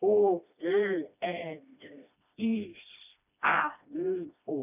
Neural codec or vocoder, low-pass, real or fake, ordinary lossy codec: codec, 16 kHz, 1 kbps, FreqCodec, smaller model; 3.6 kHz; fake; none